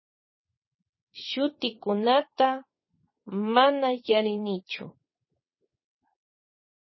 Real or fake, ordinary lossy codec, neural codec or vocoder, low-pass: fake; MP3, 24 kbps; vocoder, 22.05 kHz, 80 mel bands, WaveNeXt; 7.2 kHz